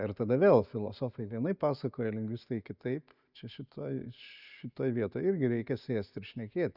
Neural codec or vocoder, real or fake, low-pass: none; real; 5.4 kHz